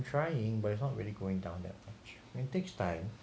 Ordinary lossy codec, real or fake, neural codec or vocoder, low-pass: none; real; none; none